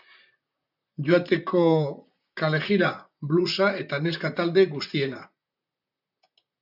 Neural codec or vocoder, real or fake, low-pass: vocoder, 44.1 kHz, 128 mel bands, Pupu-Vocoder; fake; 5.4 kHz